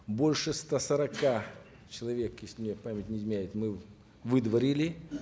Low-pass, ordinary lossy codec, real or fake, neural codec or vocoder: none; none; real; none